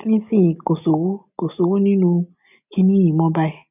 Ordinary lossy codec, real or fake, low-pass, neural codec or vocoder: none; real; 3.6 kHz; none